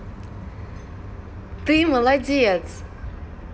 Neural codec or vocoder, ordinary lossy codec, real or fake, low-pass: none; none; real; none